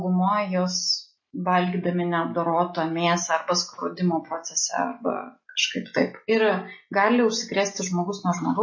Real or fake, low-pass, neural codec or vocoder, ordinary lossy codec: real; 7.2 kHz; none; MP3, 32 kbps